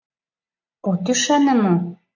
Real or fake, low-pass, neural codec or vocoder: real; 7.2 kHz; none